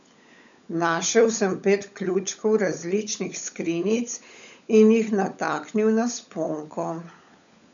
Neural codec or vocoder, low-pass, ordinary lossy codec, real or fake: codec, 16 kHz, 16 kbps, FunCodec, trained on LibriTTS, 50 frames a second; 7.2 kHz; none; fake